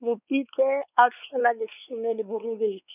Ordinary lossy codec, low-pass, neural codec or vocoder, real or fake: none; 3.6 kHz; codec, 16 kHz, 4 kbps, X-Codec, WavLM features, trained on Multilingual LibriSpeech; fake